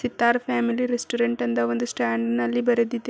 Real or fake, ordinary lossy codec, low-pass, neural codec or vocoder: real; none; none; none